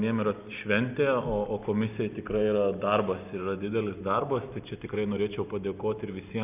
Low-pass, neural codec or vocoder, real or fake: 3.6 kHz; none; real